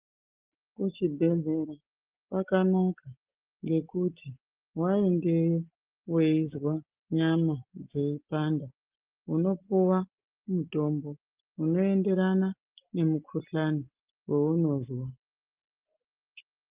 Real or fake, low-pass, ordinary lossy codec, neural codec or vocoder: real; 3.6 kHz; Opus, 24 kbps; none